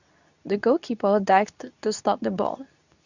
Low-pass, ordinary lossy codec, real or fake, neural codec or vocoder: 7.2 kHz; none; fake; codec, 24 kHz, 0.9 kbps, WavTokenizer, medium speech release version 2